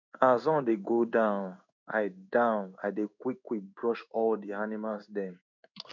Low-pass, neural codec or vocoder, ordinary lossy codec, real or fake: 7.2 kHz; codec, 16 kHz in and 24 kHz out, 1 kbps, XY-Tokenizer; none; fake